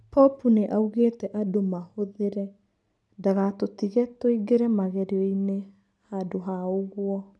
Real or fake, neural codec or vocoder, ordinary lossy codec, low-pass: real; none; none; none